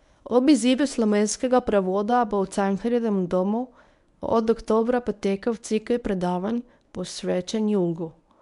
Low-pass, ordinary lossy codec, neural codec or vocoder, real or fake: 10.8 kHz; none; codec, 24 kHz, 0.9 kbps, WavTokenizer, medium speech release version 1; fake